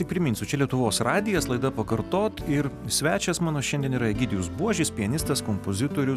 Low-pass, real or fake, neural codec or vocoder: 14.4 kHz; real; none